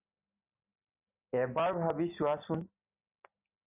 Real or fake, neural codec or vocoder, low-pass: real; none; 3.6 kHz